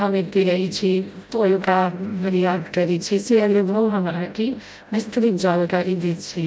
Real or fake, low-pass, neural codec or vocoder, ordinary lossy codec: fake; none; codec, 16 kHz, 0.5 kbps, FreqCodec, smaller model; none